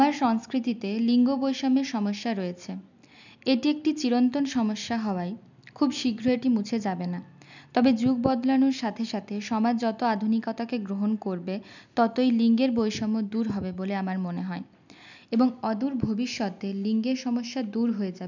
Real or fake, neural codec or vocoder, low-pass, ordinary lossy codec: real; none; 7.2 kHz; none